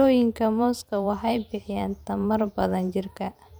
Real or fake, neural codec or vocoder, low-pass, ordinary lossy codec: fake; vocoder, 44.1 kHz, 128 mel bands every 512 samples, BigVGAN v2; none; none